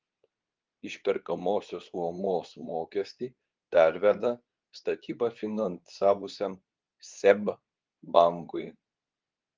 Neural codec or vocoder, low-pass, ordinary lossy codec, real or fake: codec, 24 kHz, 0.9 kbps, WavTokenizer, medium speech release version 2; 7.2 kHz; Opus, 24 kbps; fake